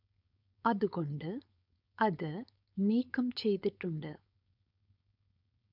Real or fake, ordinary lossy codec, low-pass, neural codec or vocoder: fake; none; 5.4 kHz; codec, 16 kHz, 4.8 kbps, FACodec